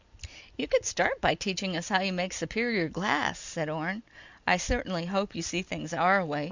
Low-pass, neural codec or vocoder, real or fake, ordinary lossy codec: 7.2 kHz; none; real; Opus, 64 kbps